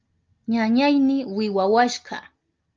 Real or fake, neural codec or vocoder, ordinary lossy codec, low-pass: real; none; Opus, 24 kbps; 7.2 kHz